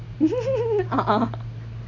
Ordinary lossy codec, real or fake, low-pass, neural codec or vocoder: none; real; 7.2 kHz; none